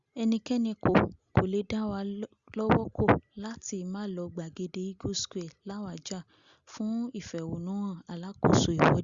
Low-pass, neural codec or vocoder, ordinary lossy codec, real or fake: 7.2 kHz; none; Opus, 64 kbps; real